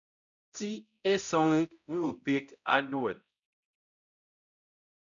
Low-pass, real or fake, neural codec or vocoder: 7.2 kHz; fake; codec, 16 kHz, 0.5 kbps, X-Codec, HuBERT features, trained on balanced general audio